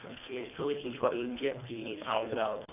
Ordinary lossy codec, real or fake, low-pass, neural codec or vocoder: none; fake; 3.6 kHz; codec, 24 kHz, 1.5 kbps, HILCodec